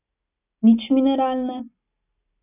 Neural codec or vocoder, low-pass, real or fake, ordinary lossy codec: none; 3.6 kHz; real; none